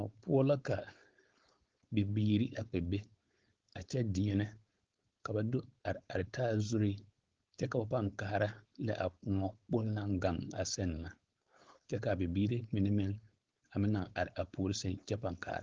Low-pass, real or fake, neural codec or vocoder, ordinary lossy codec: 7.2 kHz; fake; codec, 16 kHz, 4.8 kbps, FACodec; Opus, 16 kbps